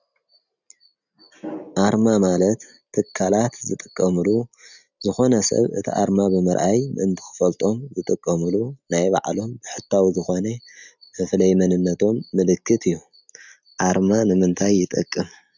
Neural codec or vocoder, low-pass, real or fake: none; 7.2 kHz; real